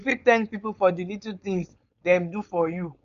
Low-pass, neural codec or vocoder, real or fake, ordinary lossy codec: 7.2 kHz; codec, 16 kHz, 4.8 kbps, FACodec; fake; none